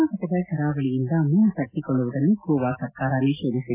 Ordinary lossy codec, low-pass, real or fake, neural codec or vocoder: AAC, 32 kbps; 3.6 kHz; real; none